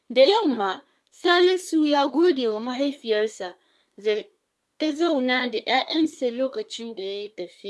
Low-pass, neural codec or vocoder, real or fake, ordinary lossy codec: none; codec, 24 kHz, 1 kbps, SNAC; fake; none